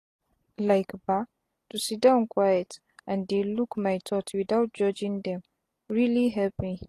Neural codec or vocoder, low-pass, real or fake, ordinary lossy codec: none; 14.4 kHz; real; AAC, 48 kbps